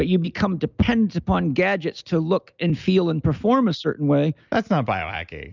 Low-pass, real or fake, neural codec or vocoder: 7.2 kHz; real; none